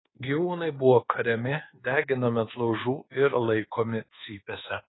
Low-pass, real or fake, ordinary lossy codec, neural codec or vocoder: 7.2 kHz; fake; AAC, 16 kbps; codec, 24 kHz, 1.2 kbps, DualCodec